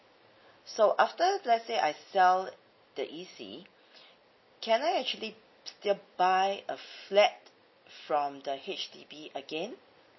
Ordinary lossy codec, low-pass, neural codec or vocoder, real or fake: MP3, 24 kbps; 7.2 kHz; none; real